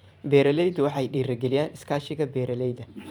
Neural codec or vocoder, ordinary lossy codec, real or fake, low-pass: vocoder, 44.1 kHz, 128 mel bands every 512 samples, BigVGAN v2; none; fake; 19.8 kHz